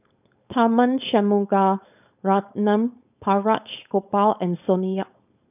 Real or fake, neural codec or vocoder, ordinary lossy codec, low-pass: fake; codec, 16 kHz, 4.8 kbps, FACodec; none; 3.6 kHz